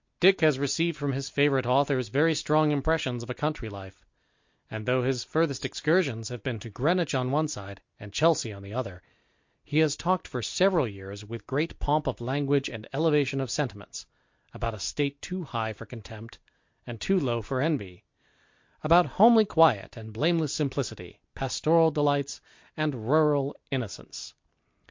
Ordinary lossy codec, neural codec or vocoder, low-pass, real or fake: MP3, 48 kbps; none; 7.2 kHz; real